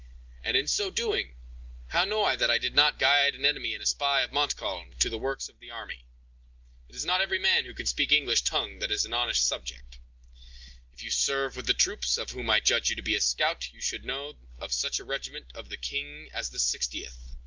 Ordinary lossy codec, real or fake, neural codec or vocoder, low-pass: Opus, 32 kbps; real; none; 7.2 kHz